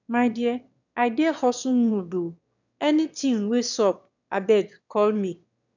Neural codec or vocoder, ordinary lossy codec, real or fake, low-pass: autoencoder, 22.05 kHz, a latent of 192 numbers a frame, VITS, trained on one speaker; none; fake; 7.2 kHz